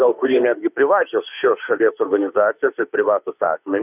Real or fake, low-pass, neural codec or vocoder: fake; 3.6 kHz; autoencoder, 48 kHz, 32 numbers a frame, DAC-VAE, trained on Japanese speech